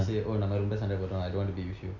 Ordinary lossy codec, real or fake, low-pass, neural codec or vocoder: none; real; 7.2 kHz; none